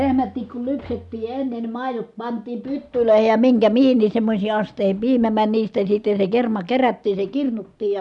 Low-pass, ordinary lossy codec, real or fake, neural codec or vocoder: 10.8 kHz; none; real; none